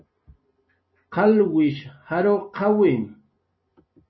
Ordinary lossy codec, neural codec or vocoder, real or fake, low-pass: MP3, 24 kbps; none; real; 7.2 kHz